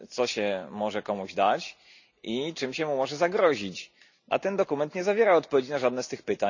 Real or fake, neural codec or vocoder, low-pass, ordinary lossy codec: real; none; 7.2 kHz; none